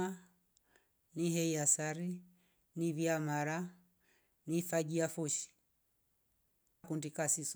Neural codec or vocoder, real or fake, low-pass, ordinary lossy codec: none; real; none; none